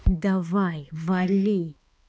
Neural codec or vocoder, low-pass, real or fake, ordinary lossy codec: codec, 16 kHz, 2 kbps, X-Codec, HuBERT features, trained on balanced general audio; none; fake; none